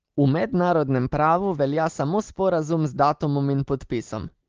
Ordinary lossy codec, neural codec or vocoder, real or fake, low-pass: Opus, 16 kbps; none; real; 7.2 kHz